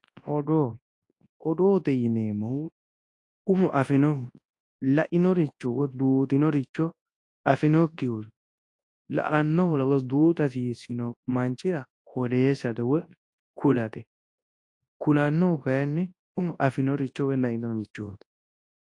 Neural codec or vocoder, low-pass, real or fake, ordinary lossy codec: codec, 24 kHz, 0.9 kbps, WavTokenizer, large speech release; 10.8 kHz; fake; AAC, 48 kbps